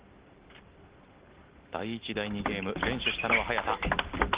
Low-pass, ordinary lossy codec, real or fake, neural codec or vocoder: 3.6 kHz; Opus, 16 kbps; real; none